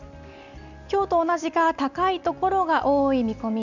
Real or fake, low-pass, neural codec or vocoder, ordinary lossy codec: real; 7.2 kHz; none; none